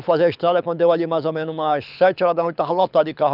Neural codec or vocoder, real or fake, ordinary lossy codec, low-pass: codec, 24 kHz, 6 kbps, HILCodec; fake; none; 5.4 kHz